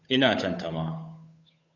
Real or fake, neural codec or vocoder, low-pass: fake; codec, 16 kHz, 8 kbps, FunCodec, trained on Chinese and English, 25 frames a second; 7.2 kHz